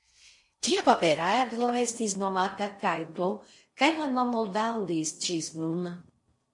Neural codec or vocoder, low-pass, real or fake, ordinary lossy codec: codec, 16 kHz in and 24 kHz out, 0.6 kbps, FocalCodec, streaming, 4096 codes; 10.8 kHz; fake; MP3, 48 kbps